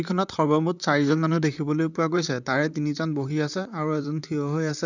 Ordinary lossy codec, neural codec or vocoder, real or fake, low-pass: none; vocoder, 44.1 kHz, 128 mel bands, Pupu-Vocoder; fake; 7.2 kHz